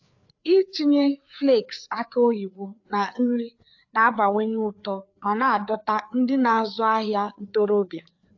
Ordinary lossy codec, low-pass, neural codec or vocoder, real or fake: AAC, 48 kbps; 7.2 kHz; codec, 16 kHz, 4 kbps, FreqCodec, larger model; fake